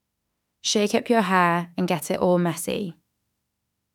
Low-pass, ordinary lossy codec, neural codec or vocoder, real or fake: 19.8 kHz; none; autoencoder, 48 kHz, 32 numbers a frame, DAC-VAE, trained on Japanese speech; fake